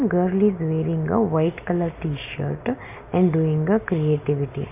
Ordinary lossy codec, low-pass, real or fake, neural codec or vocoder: AAC, 32 kbps; 3.6 kHz; real; none